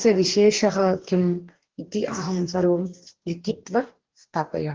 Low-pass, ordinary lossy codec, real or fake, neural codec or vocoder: 7.2 kHz; Opus, 16 kbps; fake; codec, 44.1 kHz, 2.6 kbps, DAC